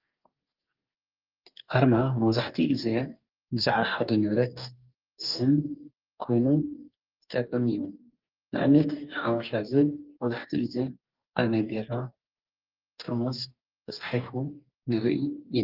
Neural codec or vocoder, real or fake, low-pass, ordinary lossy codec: codec, 44.1 kHz, 2.6 kbps, DAC; fake; 5.4 kHz; Opus, 24 kbps